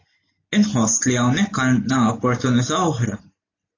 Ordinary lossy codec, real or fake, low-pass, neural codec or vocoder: AAC, 32 kbps; real; 7.2 kHz; none